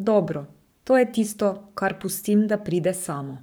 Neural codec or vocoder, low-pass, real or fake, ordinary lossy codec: codec, 44.1 kHz, 7.8 kbps, Pupu-Codec; none; fake; none